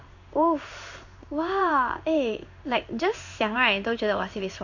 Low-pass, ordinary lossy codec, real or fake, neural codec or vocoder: 7.2 kHz; none; fake; codec, 16 kHz in and 24 kHz out, 1 kbps, XY-Tokenizer